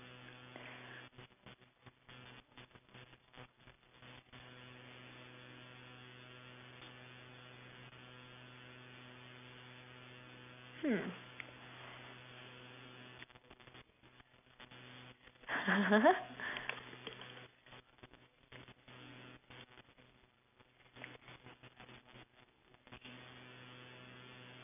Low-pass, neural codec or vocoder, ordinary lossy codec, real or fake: 3.6 kHz; none; none; real